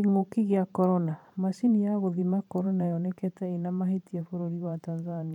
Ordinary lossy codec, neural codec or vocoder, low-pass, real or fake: none; vocoder, 44.1 kHz, 128 mel bands every 512 samples, BigVGAN v2; 19.8 kHz; fake